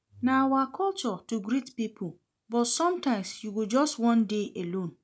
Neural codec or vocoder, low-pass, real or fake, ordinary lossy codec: none; none; real; none